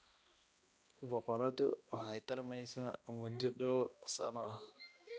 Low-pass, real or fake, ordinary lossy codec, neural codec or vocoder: none; fake; none; codec, 16 kHz, 1 kbps, X-Codec, HuBERT features, trained on balanced general audio